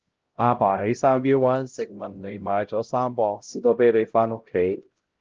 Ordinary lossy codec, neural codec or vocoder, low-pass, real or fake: Opus, 16 kbps; codec, 16 kHz, 0.5 kbps, X-Codec, HuBERT features, trained on LibriSpeech; 7.2 kHz; fake